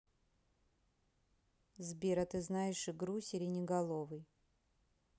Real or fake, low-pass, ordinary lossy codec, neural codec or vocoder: real; none; none; none